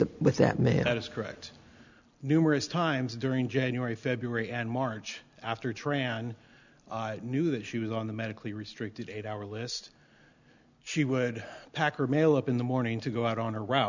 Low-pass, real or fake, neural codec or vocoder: 7.2 kHz; real; none